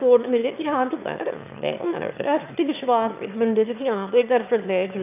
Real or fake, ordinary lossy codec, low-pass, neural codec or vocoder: fake; none; 3.6 kHz; autoencoder, 22.05 kHz, a latent of 192 numbers a frame, VITS, trained on one speaker